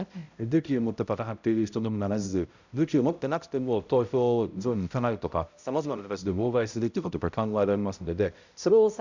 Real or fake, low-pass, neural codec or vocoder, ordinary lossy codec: fake; 7.2 kHz; codec, 16 kHz, 0.5 kbps, X-Codec, HuBERT features, trained on balanced general audio; Opus, 64 kbps